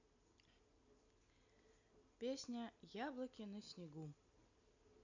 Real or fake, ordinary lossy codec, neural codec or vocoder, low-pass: real; none; none; 7.2 kHz